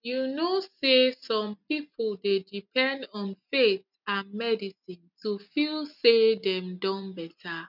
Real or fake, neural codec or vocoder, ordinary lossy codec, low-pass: real; none; none; 5.4 kHz